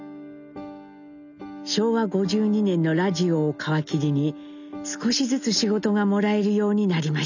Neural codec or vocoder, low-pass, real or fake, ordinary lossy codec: none; 7.2 kHz; real; none